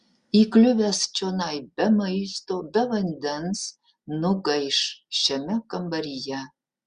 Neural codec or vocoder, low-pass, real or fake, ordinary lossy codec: none; 9.9 kHz; real; Opus, 64 kbps